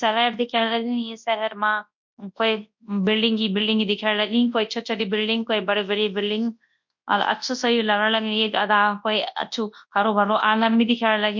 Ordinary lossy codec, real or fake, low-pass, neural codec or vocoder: MP3, 48 kbps; fake; 7.2 kHz; codec, 24 kHz, 0.9 kbps, WavTokenizer, large speech release